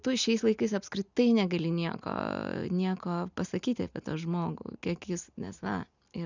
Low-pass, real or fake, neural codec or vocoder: 7.2 kHz; real; none